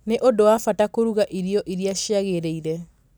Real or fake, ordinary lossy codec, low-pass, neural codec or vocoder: real; none; none; none